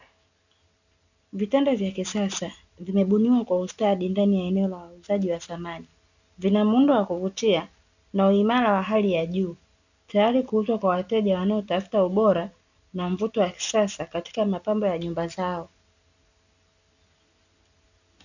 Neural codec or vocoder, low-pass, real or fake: none; 7.2 kHz; real